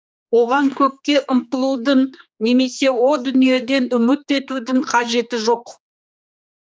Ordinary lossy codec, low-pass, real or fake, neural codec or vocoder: none; none; fake; codec, 16 kHz, 2 kbps, X-Codec, HuBERT features, trained on general audio